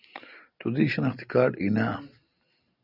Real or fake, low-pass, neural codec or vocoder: real; 5.4 kHz; none